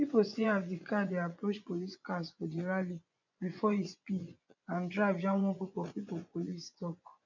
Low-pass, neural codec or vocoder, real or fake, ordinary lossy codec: 7.2 kHz; vocoder, 24 kHz, 100 mel bands, Vocos; fake; none